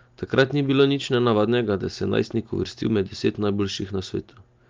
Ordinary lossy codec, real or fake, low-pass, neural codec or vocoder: Opus, 24 kbps; real; 7.2 kHz; none